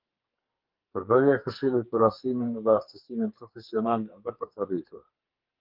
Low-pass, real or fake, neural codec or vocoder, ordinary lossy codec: 5.4 kHz; fake; codec, 44.1 kHz, 2.6 kbps, SNAC; Opus, 24 kbps